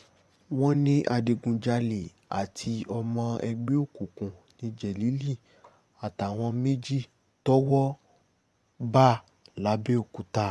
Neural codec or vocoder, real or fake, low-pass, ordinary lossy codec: vocoder, 24 kHz, 100 mel bands, Vocos; fake; none; none